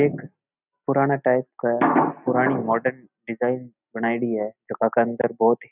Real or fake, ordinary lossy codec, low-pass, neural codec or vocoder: real; none; 3.6 kHz; none